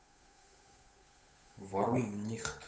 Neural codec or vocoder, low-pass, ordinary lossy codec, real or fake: codec, 16 kHz, 8 kbps, FunCodec, trained on Chinese and English, 25 frames a second; none; none; fake